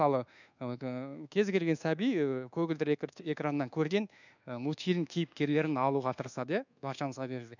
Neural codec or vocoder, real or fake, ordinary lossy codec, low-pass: codec, 24 kHz, 1.2 kbps, DualCodec; fake; none; 7.2 kHz